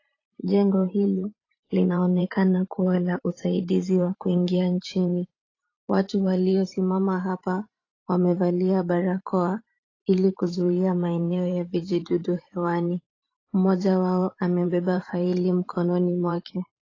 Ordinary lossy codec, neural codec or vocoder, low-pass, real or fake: AAC, 32 kbps; vocoder, 44.1 kHz, 128 mel bands every 512 samples, BigVGAN v2; 7.2 kHz; fake